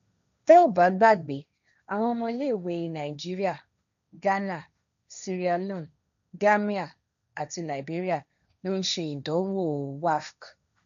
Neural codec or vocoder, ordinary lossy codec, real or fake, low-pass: codec, 16 kHz, 1.1 kbps, Voila-Tokenizer; none; fake; 7.2 kHz